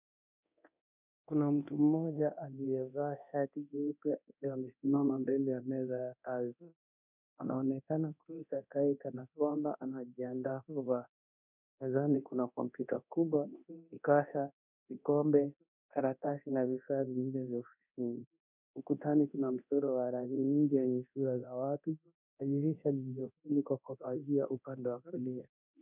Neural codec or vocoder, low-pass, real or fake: codec, 24 kHz, 0.9 kbps, DualCodec; 3.6 kHz; fake